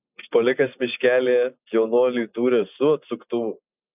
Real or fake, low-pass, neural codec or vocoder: real; 3.6 kHz; none